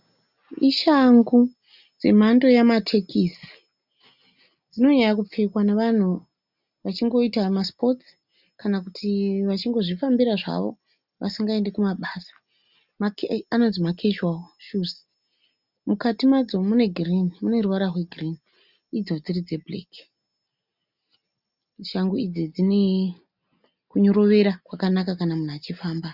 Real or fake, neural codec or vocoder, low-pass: real; none; 5.4 kHz